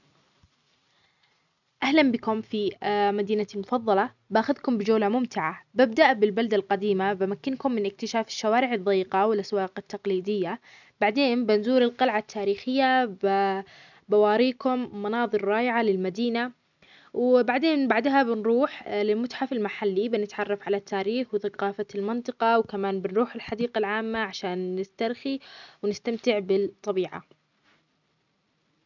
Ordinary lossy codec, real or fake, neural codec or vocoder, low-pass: none; real; none; 7.2 kHz